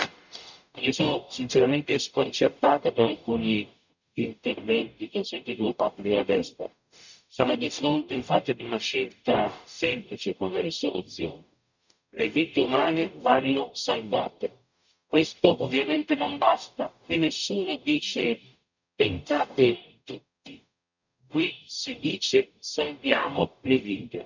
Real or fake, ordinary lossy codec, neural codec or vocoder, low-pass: fake; none; codec, 44.1 kHz, 0.9 kbps, DAC; 7.2 kHz